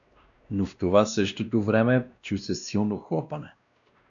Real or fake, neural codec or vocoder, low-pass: fake; codec, 16 kHz, 1 kbps, X-Codec, WavLM features, trained on Multilingual LibriSpeech; 7.2 kHz